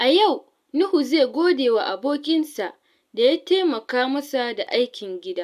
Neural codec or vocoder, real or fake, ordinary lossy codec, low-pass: none; real; AAC, 96 kbps; 14.4 kHz